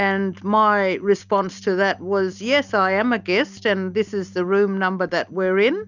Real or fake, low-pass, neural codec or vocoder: real; 7.2 kHz; none